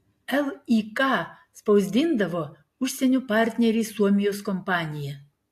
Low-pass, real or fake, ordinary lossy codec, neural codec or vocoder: 14.4 kHz; real; AAC, 64 kbps; none